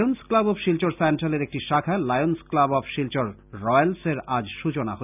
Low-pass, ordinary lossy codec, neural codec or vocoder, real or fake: 3.6 kHz; none; none; real